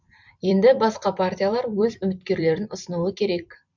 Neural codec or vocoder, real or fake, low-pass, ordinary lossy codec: vocoder, 44.1 kHz, 128 mel bands every 256 samples, BigVGAN v2; fake; 7.2 kHz; none